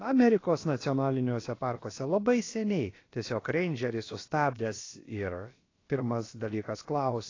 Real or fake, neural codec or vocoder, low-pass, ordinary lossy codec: fake; codec, 16 kHz, about 1 kbps, DyCAST, with the encoder's durations; 7.2 kHz; AAC, 32 kbps